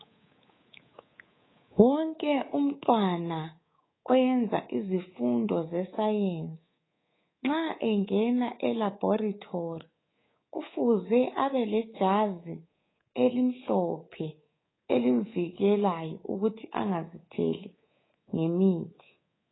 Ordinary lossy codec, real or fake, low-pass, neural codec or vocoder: AAC, 16 kbps; fake; 7.2 kHz; codec, 24 kHz, 3.1 kbps, DualCodec